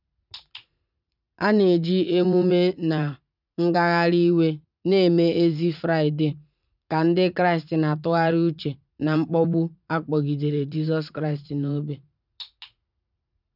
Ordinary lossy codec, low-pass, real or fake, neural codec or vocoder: none; 5.4 kHz; fake; vocoder, 44.1 kHz, 80 mel bands, Vocos